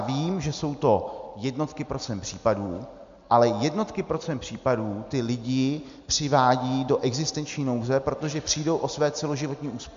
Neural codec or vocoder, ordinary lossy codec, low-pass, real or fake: none; MP3, 64 kbps; 7.2 kHz; real